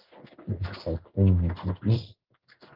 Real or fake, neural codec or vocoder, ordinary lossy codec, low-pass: fake; codec, 16 kHz in and 24 kHz out, 1 kbps, XY-Tokenizer; Opus, 24 kbps; 5.4 kHz